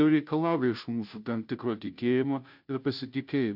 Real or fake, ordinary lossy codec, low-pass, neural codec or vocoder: fake; AAC, 48 kbps; 5.4 kHz; codec, 16 kHz, 0.5 kbps, FunCodec, trained on Chinese and English, 25 frames a second